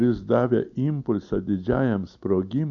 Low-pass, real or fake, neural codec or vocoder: 7.2 kHz; real; none